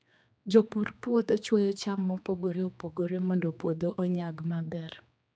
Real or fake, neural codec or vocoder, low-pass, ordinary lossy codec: fake; codec, 16 kHz, 2 kbps, X-Codec, HuBERT features, trained on general audio; none; none